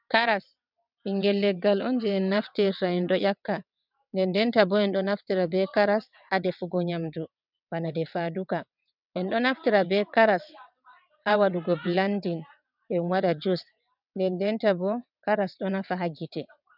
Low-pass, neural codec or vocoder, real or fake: 5.4 kHz; vocoder, 22.05 kHz, 80 mel bands, WaveNeXt; fake